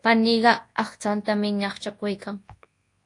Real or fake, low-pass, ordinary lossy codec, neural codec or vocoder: fake; 10.8 kHz; AAC, 48 kbps; codec, 24 kHz, 0.9 kbps, WavTokenizer, large speech release